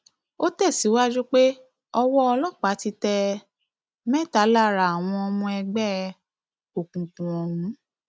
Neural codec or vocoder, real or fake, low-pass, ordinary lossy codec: none; real; none; none